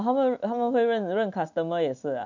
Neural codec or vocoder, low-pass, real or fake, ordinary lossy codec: none; 7.2 kHz; real; none